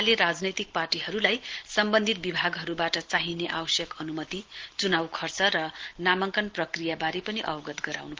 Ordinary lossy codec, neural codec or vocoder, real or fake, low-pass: Opus, 16 kbps; none; real; 7.2 kHz